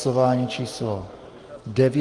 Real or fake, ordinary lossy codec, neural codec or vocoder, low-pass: real; Opus, 24 kbps; none; 10.8 kHz